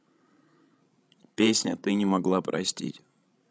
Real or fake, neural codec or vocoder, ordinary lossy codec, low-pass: fake; codec, 16 kHz, 16 kbps, FreqCodec, larger model; none; none